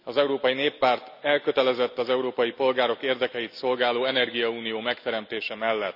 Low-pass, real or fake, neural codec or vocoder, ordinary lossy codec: 5.4 kHz; real; none; none